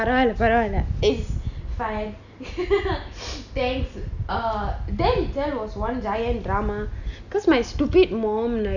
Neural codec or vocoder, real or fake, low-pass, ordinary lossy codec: none; real; 7.2 kHz; none